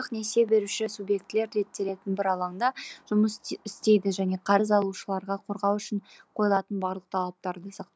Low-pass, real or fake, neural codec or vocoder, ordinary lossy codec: none; real; none; none